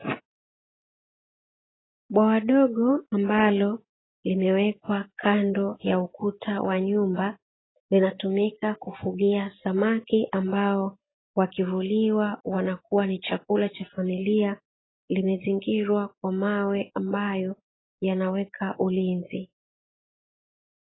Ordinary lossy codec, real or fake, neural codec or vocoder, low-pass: AAC, 16 kbps; real; none; 7.2 kHz